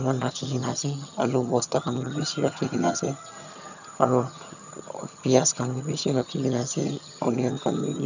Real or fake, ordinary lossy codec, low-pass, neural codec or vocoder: fake; none; 7.2 kHz; vocoder, 22.05 kHz, 80 mel bands, HiFi-GAN